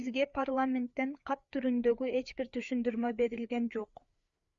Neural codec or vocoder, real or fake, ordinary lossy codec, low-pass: codec, 16 kHz, 4 kbps, FreqCodec, larger model; fake; Opus, 64 kbps; 7.2 kHz